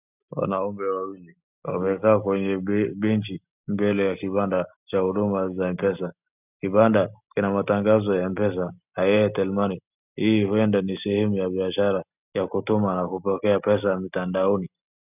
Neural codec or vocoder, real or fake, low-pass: none; real; 3.6 kHz